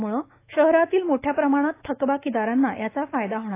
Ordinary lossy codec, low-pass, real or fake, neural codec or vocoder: AAC, 24 kbps; 3.6 kHz; fake; autoencoder, 48 kHz, 128 numbers a frame, DAC-VAE, trained on Japanese speech